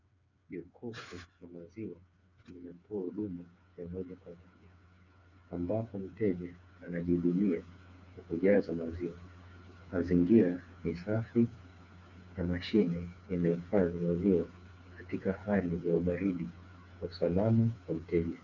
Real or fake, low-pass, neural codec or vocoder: fake; 7.2 kHz; codec, 16 kHz, 4 kbps, FreqCodec, smaller model